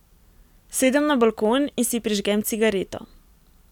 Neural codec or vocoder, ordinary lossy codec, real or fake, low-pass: none; none; real; 19.8 kHz